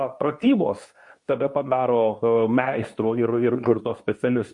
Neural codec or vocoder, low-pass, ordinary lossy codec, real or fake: codec, 24 kHz, 0.9 kbps, WavTokenizer, medium speech release version 2; 10.8 kHz; MP3, 48 kbps; fake